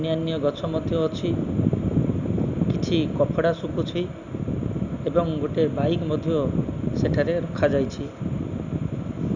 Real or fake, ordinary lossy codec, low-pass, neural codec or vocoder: real; Opus, 64 kbps; 7.2 kHz; none